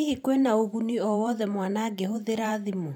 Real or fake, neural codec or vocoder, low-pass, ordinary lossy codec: fake; vocoder, 44.1 kHz, 128 mel bands every 512 samples, BigVGAN v2; 19.8 kHz; none